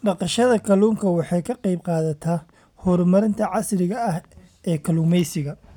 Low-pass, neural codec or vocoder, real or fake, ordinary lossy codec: 19.8 kHz; none; real; none